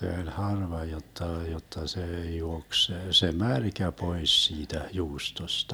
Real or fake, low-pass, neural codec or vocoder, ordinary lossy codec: real; none; none; none